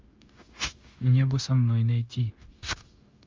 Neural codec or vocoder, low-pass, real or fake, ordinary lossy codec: codec, 16 kHz, 0.9 kbps, LongCat-Audio-Codec; 7.2 kHz; fake; Opus, 32 kbps